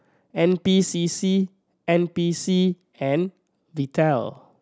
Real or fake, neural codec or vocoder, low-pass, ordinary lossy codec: real; none; none; none